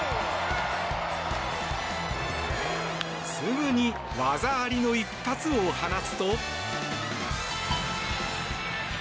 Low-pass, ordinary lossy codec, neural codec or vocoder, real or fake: none; none; none; real